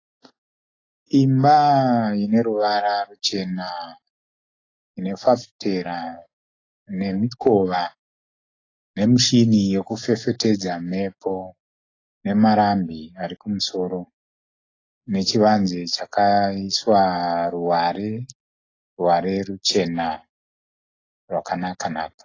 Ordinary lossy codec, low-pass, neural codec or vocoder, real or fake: AAC, 32 kbps; 7.2 kHz; none; real